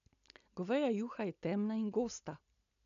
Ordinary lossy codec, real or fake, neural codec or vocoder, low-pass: none; real; none; 7.2 kHz